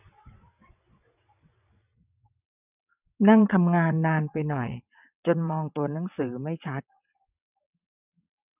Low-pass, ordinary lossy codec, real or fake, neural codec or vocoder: 3.6 kHz; none; fake; vocoder, 22.05 kHz, 80 mel bands, WaveNeXt